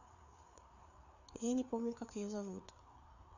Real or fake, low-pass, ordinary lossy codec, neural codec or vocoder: fake; 7.2 kHz; none; codec, 16 kHz, 4 kbps, FreqCodec, larger model